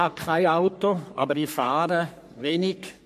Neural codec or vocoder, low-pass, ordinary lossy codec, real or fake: codec, 44.1 kHz, 3.4 kbps, Pupu-Codec; 14.4 kHz; MP3, 64 kbps; fake